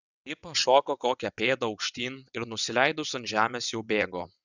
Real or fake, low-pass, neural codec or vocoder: fake; 7.2 kHz; vocoder, 22.05 kHz, 80 mel bands, WaveNeXt